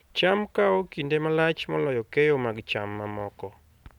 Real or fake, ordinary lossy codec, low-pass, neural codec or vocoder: real; none; 19.8 kHz; none